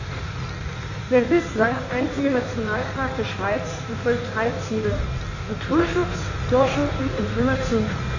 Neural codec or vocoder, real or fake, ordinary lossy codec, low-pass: codec, 16 kHz in and 24 kHz out, 1.1 kbps, FireRedTTS-2 codec; fake; none; 7.2 kHz